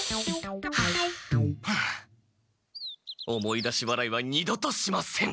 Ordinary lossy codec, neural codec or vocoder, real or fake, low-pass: none; none; real; none